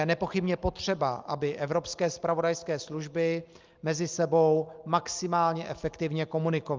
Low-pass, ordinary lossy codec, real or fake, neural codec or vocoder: 7.2 kHz; Opus, 32 kbps; real; none